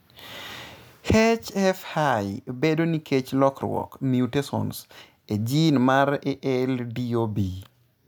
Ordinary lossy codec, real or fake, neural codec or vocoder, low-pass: none; real; none; none